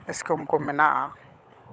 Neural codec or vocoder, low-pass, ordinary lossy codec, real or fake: codec, 16 kHz, 16 kbps, FunCodec, trained on LibriTTS, 50 frames a second; none; none; fake